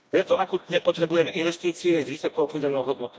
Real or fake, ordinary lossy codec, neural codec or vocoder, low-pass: fake; none; codec, 16 kHz, 1 kbps, FreqCodec, smaller model; none